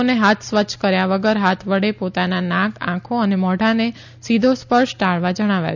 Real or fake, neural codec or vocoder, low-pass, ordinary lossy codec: real; none; 7.2 kHz; none